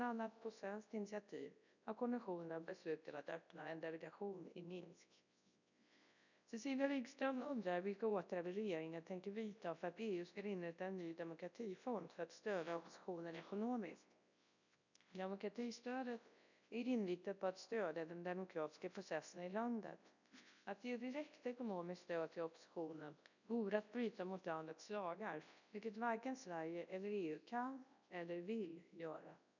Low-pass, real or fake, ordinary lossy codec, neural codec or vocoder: 7.2 kHz; fake; none; codec, 24 kHz, 0.9 kbps, WavTokenizer, large speech release